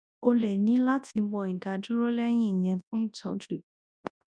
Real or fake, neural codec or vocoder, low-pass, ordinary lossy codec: fake; codec, 24 kHz, 0.9 kbps, WavTokenizer, large speech release; 9.9 kHz; none